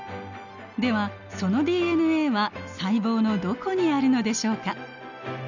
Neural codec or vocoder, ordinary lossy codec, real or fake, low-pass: none; none; real; 7.2 kHz